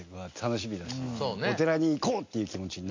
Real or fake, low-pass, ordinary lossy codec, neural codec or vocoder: real; 7.2 kHz; MP3, 64 kbps; none